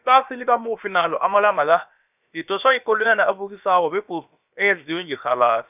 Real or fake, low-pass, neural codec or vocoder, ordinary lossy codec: fake; 3.6 kHz; codec, 16 kHz, about 1 kbps, DyCAST, with the encoder's durations; none